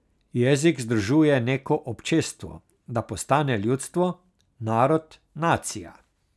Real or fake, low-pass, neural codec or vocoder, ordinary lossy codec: real; none; none; none